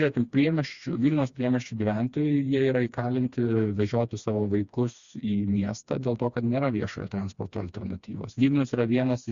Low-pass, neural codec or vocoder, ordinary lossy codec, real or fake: 7.2 kHz; codec, 16 kHz, 2 kbps, FreqCodec, smaller model; Opus, 64 kbps; fake